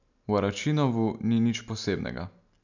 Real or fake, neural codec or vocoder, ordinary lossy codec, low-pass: real; none; none; 7.2 kHz